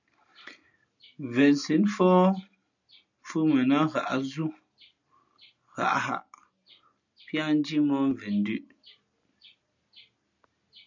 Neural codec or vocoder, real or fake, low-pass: none; real; 7.2 kHz